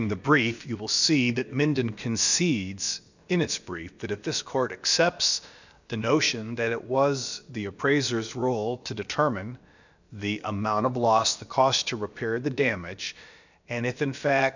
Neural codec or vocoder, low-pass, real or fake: codec, 16 kHz, about 1 kbps, DyCAST, with the encoder's durations; 7.2 kHz; fake